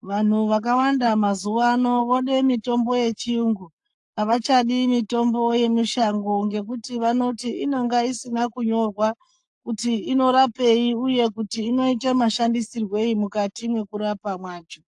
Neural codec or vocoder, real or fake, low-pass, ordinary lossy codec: codec, 44.1 kHz, 7.8 kbps, Pupu-Codec; fake; 10.8 kHz; AAC, 64 kbps